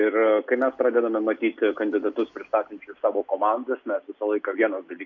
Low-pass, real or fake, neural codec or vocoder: 7.2 kHz; real; none